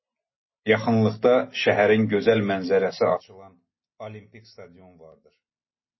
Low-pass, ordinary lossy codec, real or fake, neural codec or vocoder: 7.2 kHz; MP3, 24 kbps; real; none